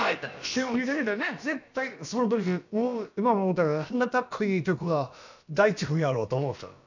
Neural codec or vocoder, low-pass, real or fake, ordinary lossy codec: codec, 16 kHz, about 1 kbps, DyCAST, with the encoder's durations; 7.2 kHz; fake; none